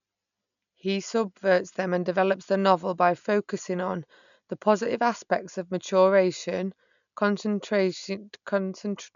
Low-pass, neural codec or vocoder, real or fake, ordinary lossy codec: 7.2 kHz; none; real; none